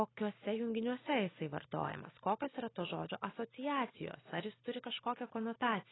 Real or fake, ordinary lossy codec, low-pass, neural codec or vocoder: real; AAC, 16 kbps; 7.2 kHz; none